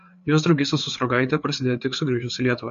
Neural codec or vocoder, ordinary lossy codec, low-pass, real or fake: codec, 16 kHz, 4 kbps, FreqCodec, larger model; MP3, 48 kbps; 7.2 kHz; fake